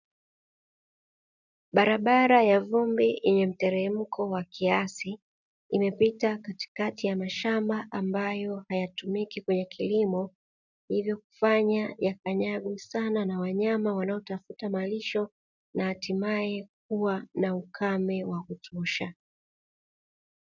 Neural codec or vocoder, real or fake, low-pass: none; real; 7.2 kHz